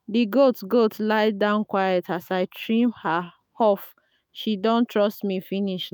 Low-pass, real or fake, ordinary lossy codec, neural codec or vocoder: none; fake; none; autoencoder, 48 kHz, 128 numbers a frame, DAC-VAE, trained on Japanese speech